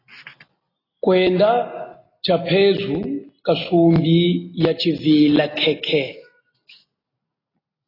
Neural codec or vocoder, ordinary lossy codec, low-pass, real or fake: none; AAC, 24 kbps; 5.4 kHz; real